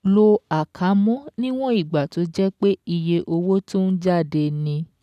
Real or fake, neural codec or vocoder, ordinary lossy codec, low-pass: real; none; none; 14.4 kHz